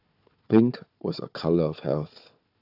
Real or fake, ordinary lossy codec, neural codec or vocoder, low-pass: fake; AAC, 48 kbps; codec, 16 kHz, 4 kbps, FunCodec, trained on Chinese and English, 50 frames a second; 5.4 kHz